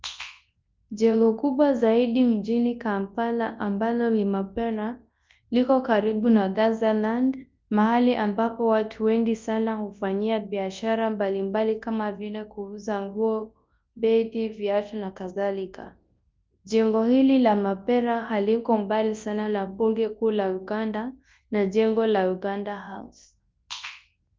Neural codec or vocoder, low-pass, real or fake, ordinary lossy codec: codec, 24 kHz, 0.9 kbps, WavTokenizer, large speech release; 7.2 kHz; fake; Opus, 32 kbps